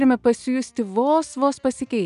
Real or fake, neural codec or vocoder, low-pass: real; none; 10.8 kHz